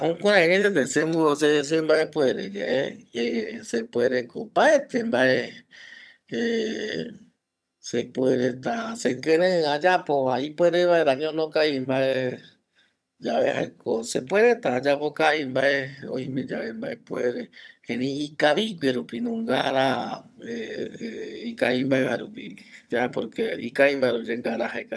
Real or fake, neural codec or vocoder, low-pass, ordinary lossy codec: fake; vocoder, 22.05 kHz, 80 mel bands, HiFi-GAN; none; none